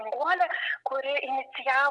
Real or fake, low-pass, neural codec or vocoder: real; 10.8 kHz; none